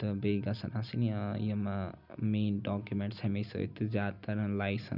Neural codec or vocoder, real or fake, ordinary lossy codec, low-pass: none; real; none; 5.4 kHz